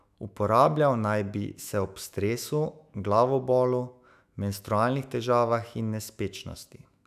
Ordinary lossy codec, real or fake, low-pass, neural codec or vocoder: none; fake; 14.4 kHz; autoencoder, 48 kHz, 128 numbers a frame, DAC-VAE, trained on Japanese speech